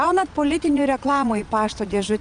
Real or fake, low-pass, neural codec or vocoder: fake; 9.9 kHz; vocoder, 22.05 kHz, 80 mel bands, Vocos